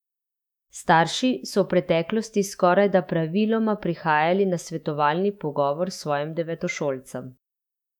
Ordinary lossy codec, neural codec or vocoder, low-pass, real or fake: none; autoencoder, 48 kHz, 128 numbers a frame, DAC-VAE, trained on Japanese speech; 19.8 kHz; fake